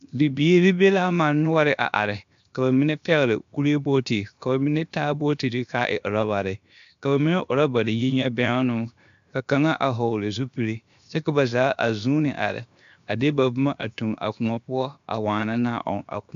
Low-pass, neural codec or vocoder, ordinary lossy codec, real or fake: 7.2 kHz; codec, 16 kHz, 0.7 kbps, FocalCodec; MP3, 64 kbps; fake